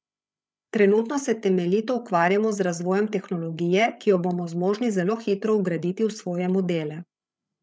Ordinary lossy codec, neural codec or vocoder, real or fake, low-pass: none; codec, 16 kHz, 8 kbps, FreqCodec, larger model; fake; none